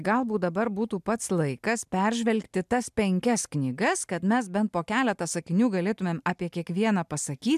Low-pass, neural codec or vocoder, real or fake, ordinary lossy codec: 14.4 kHz; none; real; MP3, 96 kbps